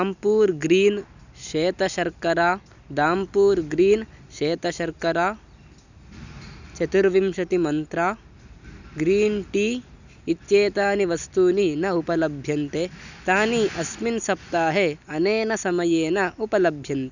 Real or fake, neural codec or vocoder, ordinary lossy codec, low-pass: real; none; none; 7.2 kHz